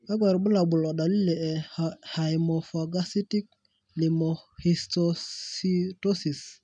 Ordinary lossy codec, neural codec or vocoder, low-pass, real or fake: none; none; 9.9 kHz; real